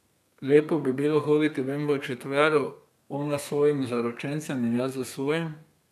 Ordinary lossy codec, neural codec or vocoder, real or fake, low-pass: none; codec, 32 kHz, 1.9 kbps, SNAC; fake; 14.4 kHz